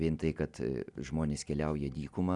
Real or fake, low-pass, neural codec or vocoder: real; 10.8 kHz; none